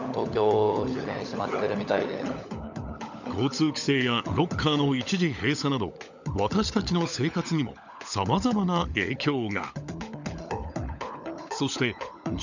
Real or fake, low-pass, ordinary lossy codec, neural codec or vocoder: fake; 7.2 kHz; none; codec, 16 kHz, 16 kbps, FunCodec, trained on LibriTTS, 50 frames a second